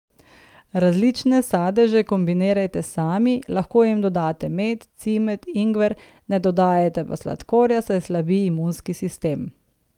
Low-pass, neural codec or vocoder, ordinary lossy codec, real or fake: 19.8 kHz; none; Opus, 32 kbps; real